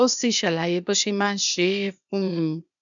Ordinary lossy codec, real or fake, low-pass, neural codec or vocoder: none; fake; 7.2 kHz; codec, 16 kHz, 0.8 kbps, ZipCodec